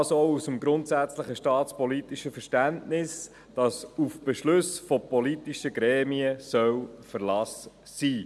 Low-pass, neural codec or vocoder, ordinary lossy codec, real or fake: none; none; none; real